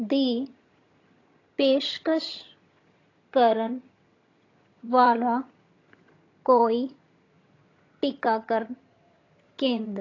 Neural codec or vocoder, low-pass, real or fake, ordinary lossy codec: vocoder, 22.05 kHz, 80 mel bands, HiFi-GAN; 7.2 kHz; fake; AAC, 32 kbps